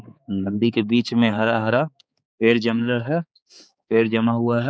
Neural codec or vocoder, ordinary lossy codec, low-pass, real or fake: codec, 16 kHz, 4 kbps, X-Codec, HuBERT features, trained on balanced general audio; none; none; fake